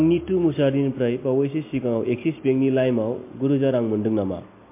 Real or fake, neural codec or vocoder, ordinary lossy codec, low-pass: real; none; MP3, 24 kbps; 3.6 kHz